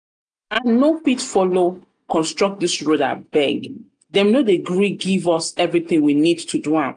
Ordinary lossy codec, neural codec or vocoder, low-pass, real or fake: none; none; 9.9 kHz; real